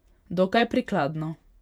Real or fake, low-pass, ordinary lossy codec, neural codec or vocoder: fake; 19.8 kHz; none; vocoder, 48 kHz, 128 mel bands, Vocos